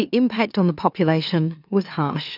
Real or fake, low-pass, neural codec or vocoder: fake; 5.4 kHz; autoencoder, 44.1 kHz, a latent of 192 numbers a frame, MeloTTS